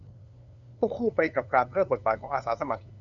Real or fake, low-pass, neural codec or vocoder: fake; 7.2 kHz; codec, 16 kHz, 2 kbps, FunCodec, trained on LibriTTS, 25 frames a second